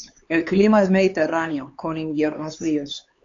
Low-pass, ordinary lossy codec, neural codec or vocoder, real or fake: 7.2 kHz; AAC, 48 kbps; codec, 16 kHz, 4 kbps, X-Codec, HuBERT features, trained on LibriSpeech; fake